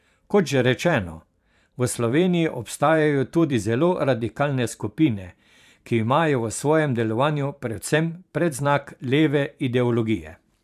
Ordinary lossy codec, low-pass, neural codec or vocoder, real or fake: AAC, 96 kbps; 14.4 kHz; vocoder, 44.1 kHz, 128 mel bands every 512 samples, BigVGAN v2; fake